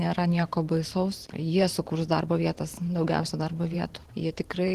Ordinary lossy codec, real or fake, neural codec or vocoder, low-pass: Opus, 16 kbps; fake; vocoder, 44.1 kHz, 128 mel bands every 512 samples, BigVGAN v2; 14.4 kHz